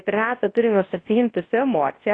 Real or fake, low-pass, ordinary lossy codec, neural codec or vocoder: fake; 9.9 kHz; AAC, 32 kbps; codec, 24 kHz, 0.9 kbps, WavTokenizer, large speech release